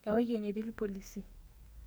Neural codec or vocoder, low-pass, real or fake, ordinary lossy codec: codec, 44.1 kHz, 2.6 kbps, SNAC; none; fake; none